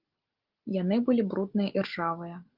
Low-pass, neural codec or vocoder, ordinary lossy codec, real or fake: 5.4 kHz; none; Opus, 32 kbps; real